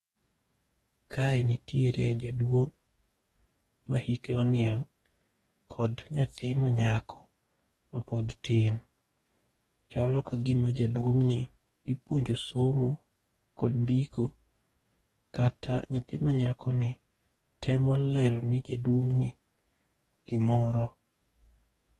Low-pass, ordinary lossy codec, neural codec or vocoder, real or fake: 19.8 kHz; AAC, 32 kbps; codec, 44.1 kHz, 2.6 kbps, DAC; fake